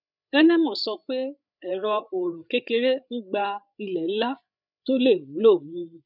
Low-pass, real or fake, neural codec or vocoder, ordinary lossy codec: 5.4 kHz; fake; codec, 16 kHz, 8 kbps, FreqCodec, larger model; none